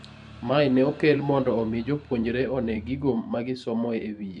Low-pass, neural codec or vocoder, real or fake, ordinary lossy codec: 10.8 kHz; vocoder, 44.1 kHz, 128 mel bands every 512 samples, BigVGAN v2; fake; MP3, 64 kbps